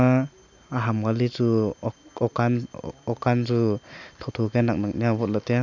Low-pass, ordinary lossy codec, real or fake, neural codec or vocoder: 7.2 kHz; none; real; none